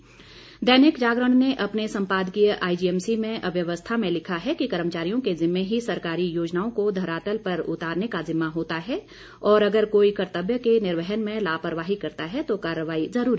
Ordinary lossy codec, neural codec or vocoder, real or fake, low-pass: none; none; real; none